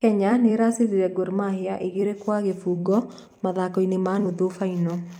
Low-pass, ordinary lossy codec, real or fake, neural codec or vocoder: 19.8 kHz; none; fake; vocoder, 44.1 kHz, 128 mel bands every 256 samples, BigVGAN v2